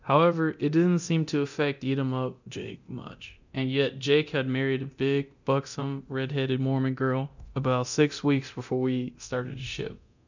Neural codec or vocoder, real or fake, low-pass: codec, 24 kHz, 0.9 kbps, DualCodec; fake; 7.2 kHz